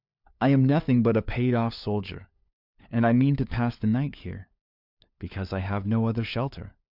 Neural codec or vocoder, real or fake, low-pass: codec, 16 kHz, 4 kbps, FunCodec, trained on LibriTTS, 50 frames a second; fake; 5.4 kHz